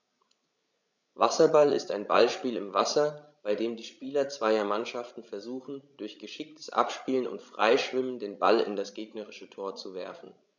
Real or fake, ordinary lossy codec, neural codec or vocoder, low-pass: fake; none; codec, 16 kHz, 16 kbps, FreqCodec, larger model; 7.2 kHz